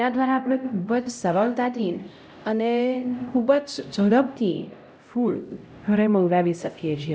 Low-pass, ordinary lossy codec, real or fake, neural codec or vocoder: none; none; fake; codec, 16 kHz, 0.5 kbps, X-Codec, HuBERT features, trained on LibriSpeech